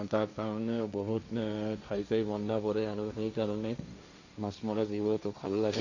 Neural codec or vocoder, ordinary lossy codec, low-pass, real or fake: codec, 16 kHz, 1.1 kbps, Voila-Tokenizer; none; 7.2 kHz; fake